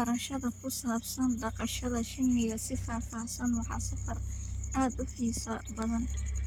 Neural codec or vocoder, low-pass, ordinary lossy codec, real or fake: codec, 44.1 kHz, 7.8 kbps, Pupu-Codec; none; none; fake